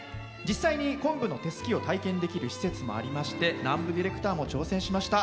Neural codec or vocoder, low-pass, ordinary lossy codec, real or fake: none; none; none; real